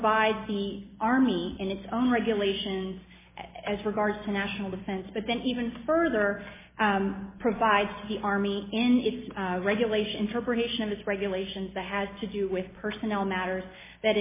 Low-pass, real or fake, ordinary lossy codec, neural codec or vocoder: 3.6 kHz; real; MP3, 16 kbps; none